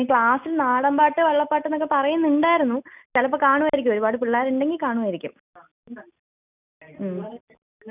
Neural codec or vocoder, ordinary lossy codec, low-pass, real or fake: none; none; 3.6 kHz; real